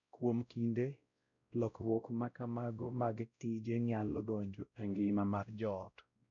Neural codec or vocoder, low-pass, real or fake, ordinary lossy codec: codec, 16 kHz, 0.5 kbps, X-Codec, WavLM features, trained on Multilingual LibriSpeech; 7.2 kHz; fake; none